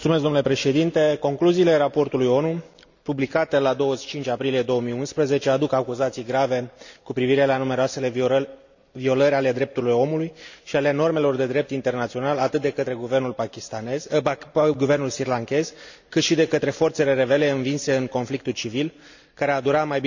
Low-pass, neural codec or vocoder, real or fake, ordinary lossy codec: 7.2 kHz; none; real; none